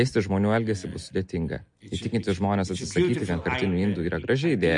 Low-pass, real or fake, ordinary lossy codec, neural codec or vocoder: 10.8 kHz; real; MP3, 48 kbps; none